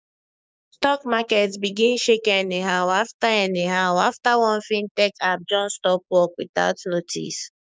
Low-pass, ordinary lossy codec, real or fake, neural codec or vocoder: none; none; fake; codec, 16 kHz, 6 kbps, DAC